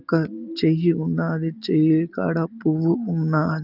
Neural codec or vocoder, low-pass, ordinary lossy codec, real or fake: none; 5.4 kHz; Opus, 32 kbps; real